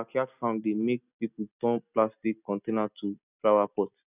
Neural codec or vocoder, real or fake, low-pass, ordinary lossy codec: none; real; 3.6 kHz; none